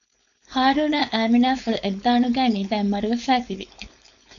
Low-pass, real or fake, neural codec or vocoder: 7.2 kHz; fake; codec, 16 kHz, 4.8 kbps, FACodec